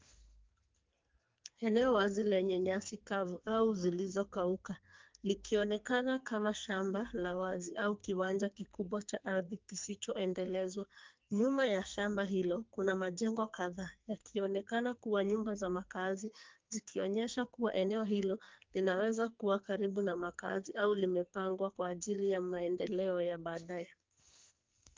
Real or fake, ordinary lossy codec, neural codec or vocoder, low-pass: fake; Opus, 32 kbps; codec, 44.1 kHz, 2.6 kbps, SNAC; 7.2 kHz